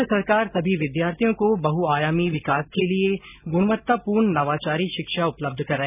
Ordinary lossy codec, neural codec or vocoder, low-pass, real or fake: none; none; 3.6 kHz; real